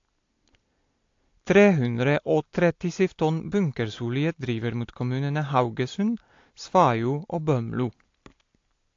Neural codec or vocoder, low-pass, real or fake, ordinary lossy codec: none; 7.2 kHz; real; AAC, 48 kbps